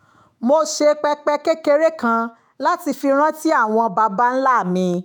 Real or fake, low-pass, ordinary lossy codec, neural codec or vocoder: fake; none; none; autoencoder, 48 kHz, 128 numbers a frame, DAC-VAE, trained on Japanese speech